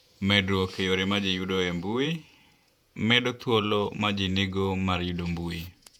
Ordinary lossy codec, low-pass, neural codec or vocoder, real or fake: none; 19.8 kHz; vocoder, 48 kHz, 128 mel bands, Vocos; fake